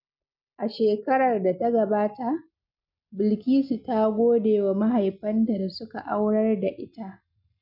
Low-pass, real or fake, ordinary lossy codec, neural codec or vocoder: 5.4 kHz; real; none; none